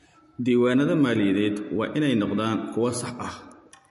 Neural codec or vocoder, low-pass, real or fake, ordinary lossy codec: none; 14.4 kHz; real; MP3, 48 kbps